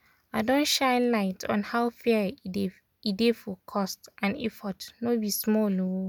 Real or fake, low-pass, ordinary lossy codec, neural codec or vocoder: real; 19.8 kHz; none; none